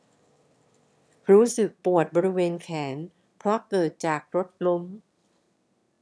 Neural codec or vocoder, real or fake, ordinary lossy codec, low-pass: autoencoder, 22.05 kHz, a latent of 192 numbers a frame, VITS, trained on one speaker; fake; none; none